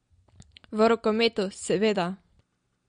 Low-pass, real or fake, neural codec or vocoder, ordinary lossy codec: 9.9 kHz; real; none; MP3, 48 kbps